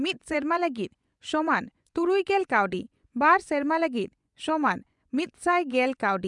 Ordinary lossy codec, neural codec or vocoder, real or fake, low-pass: none; vocoder, 24 kHz, 100 mel bands, Vocos; fake; 10.8 kHz